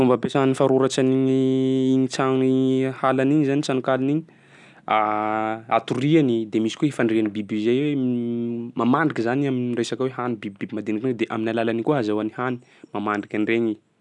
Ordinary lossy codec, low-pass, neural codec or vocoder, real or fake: none; 10.8 kHz; none; real